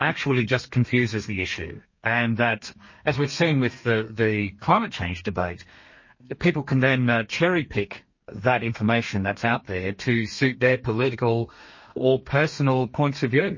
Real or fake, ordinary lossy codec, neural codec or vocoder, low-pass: fake; MP3, 32 kbps; codec, 32 kHz, 1.9 kbps, SNAC; 7.2 kHz